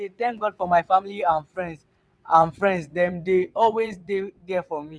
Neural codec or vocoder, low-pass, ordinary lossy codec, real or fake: vocoder, 22.05 kHz, 80 mel bands, WaveNeXt; none; none; fake